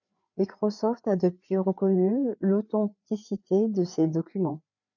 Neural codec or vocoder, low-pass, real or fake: codec, 16 kHz, 4 kbps, FreqCodec, larger model; 7.2 kHz; fake